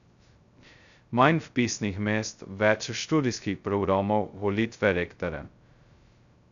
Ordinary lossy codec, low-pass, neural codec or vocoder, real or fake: none; 7.2 kHz; codec, 16 kHz, 0.2 kbps, FocalCodec; fake